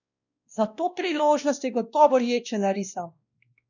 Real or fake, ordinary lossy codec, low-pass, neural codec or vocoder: fake; none; 7.2 kHz; codec, 16 kHz, 1 kbps, X-Codec, WavLM features, trained on Multilingual LibriSpeech